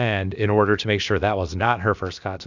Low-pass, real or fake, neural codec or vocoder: 7.2 kHz; fake; codec, 16 kHz, about 1 kbps, DyCAST, with the encoder's durations